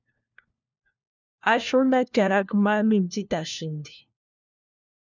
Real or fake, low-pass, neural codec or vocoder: fake; 7.2 kHz; codec, 16 kHz, 1 kbps, FunCodec, trained on LibriTTS, 50 frames a second